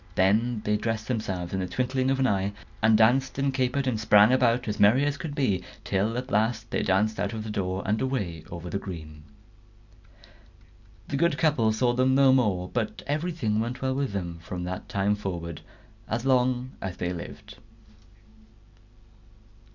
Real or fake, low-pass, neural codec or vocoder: real; 7.2 kHz; none